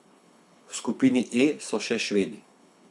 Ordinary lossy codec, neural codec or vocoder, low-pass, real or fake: none; codec, 24 kHz, 6 kbps, HILCodec; none; fake